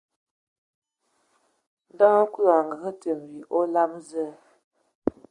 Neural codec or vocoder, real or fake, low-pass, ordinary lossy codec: none; real; 10.8 kHz; Opus, 64 kbps